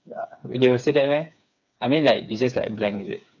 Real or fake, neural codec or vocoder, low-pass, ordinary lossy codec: fake; codec, 16 kHz, 4 kbps, FreqCodec, smaller model; 7.2 kHz; none